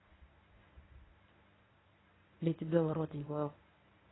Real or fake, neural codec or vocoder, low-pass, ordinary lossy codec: fake; codec, 24 kHz, 0.9 kbps, WavTokenizer, medium speech release version 1; 7.2 kHz; AAC, 16 kbps